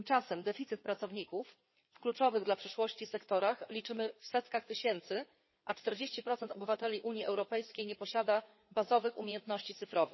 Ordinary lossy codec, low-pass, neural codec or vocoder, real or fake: MP3, 24 kbps; 7.2 kHz; codec, 16 kHz in and 24 kHz out, 2.2 kbps, FireRedTTS-2 codec; fake